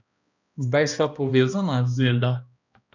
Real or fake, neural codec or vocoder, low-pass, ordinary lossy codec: fake; codec, 16 kHz, 1 kbps, X-Codec, HuBERT features, trained on balanced general audio; 7.2 kHz; none